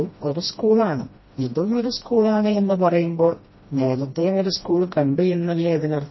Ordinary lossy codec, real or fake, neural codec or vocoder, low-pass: MP3, 24 kbps; fake; codec, 16 kHz, 1 kbps, FreqCodec, smaller model; 7.2 kHz